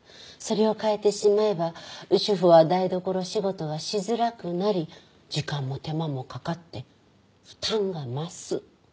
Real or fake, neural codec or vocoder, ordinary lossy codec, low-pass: real; none; none; none